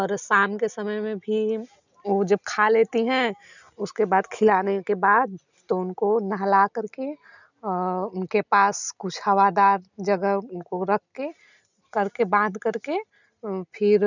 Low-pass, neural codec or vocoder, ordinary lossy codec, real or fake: 7.2 kHz; none; none; real